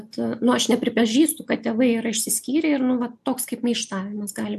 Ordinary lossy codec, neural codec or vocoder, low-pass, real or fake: MP3, 64 kbps; none; 14.4 kHz; real